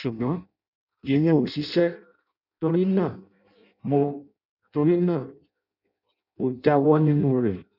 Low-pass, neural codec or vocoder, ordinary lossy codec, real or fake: 5.4 kHz; codec, 16 kHz in and 24 kHz out, 0.6 kbps, FireRedTTS-2 codec; none; fake